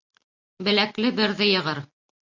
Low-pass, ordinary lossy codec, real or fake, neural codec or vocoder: 7.2 kHz; MP3, 48 kbps; real; none